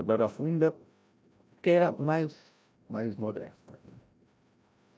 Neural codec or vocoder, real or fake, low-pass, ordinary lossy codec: codec, 16 kHz, 0.5 kbps, FreqCodec, larger model; fake; none; none